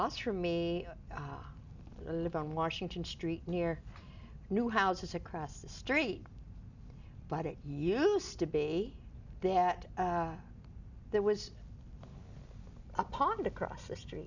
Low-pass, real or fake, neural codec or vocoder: 7.2 kHz; real; none